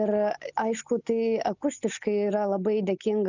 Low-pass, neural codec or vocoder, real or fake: 7.2 kHz; none; real